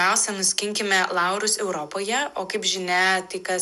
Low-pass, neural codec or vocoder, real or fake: 14.4 kHz; none; real